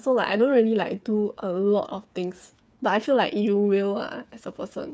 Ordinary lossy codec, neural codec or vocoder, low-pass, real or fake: none; codec, 16 kHz, 8 kbps, FreqCodec, smaller model; none; fake